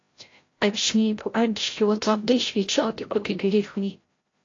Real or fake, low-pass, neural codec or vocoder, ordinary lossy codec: fake; 7.2 kHz; codec, 16 kHz, 0.5 kbps, FreqCodec, larger model; AAC, 32 kbps